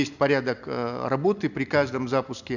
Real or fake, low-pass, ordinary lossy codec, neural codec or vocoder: real; 7.2 kHz; none; none